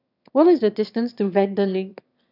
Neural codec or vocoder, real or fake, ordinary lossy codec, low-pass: autoencoder, 22.05 kHz, a latent of 192 numbers a frame, VITS, trained on one speaker; fake; none; 5.4 kHz